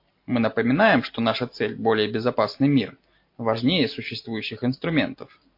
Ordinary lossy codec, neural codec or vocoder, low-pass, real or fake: MP3, 32 kbps; none; 5.4 kHz; real